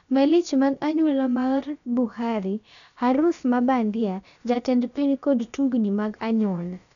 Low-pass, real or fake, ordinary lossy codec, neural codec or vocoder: 7.2 kHz; fake; none; codec, 16 kHz, about 1 kbps, DyCAST, with the encoder's durations